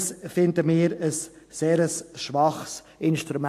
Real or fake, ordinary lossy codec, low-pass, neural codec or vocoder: real; AAC, 64 kbps; 14.4 kHz; none